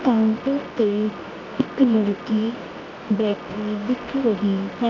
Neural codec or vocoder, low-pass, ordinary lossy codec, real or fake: codec, 24 kHz, 0.9 kbps, WavTokenizer, medium music audio release; 7.2 kHz; none; fake